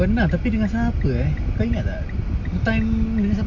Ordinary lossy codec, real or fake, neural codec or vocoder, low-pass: MP3, 64 kbps; real; none; 7.2 kHz